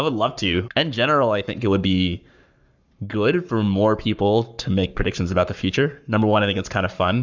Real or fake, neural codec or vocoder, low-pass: fake; codec, 44.1 kHz, 7.8 kbps, Pupu-Codec; 7.2 kHz